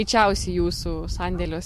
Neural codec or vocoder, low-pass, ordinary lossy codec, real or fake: none; 14.4 kHz; MP3, 64 kbps; real